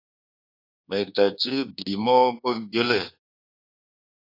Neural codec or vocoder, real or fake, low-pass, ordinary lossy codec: codec, 24 kHz, 1.2 kbps, DualCodec; fake; 5.4 kHz; AAC, 32 kbps